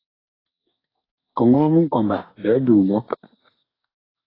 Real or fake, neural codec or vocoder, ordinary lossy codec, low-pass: fake; codec, 44.1 kHz, 2.6 kbps, DAC; AAC, 24 kbps; 5.4 kHz